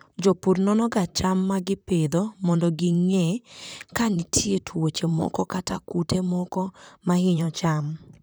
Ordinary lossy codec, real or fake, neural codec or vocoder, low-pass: none; fake; vocoder, 44.1 kHz, 128 mel bands, Pupu-Vocoder; none